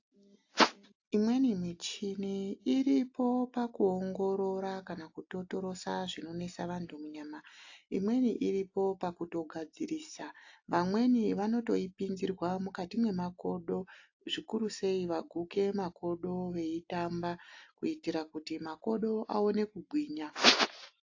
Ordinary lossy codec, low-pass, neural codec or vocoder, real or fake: AAC, 48 kbps; 7.2 kHz; none; real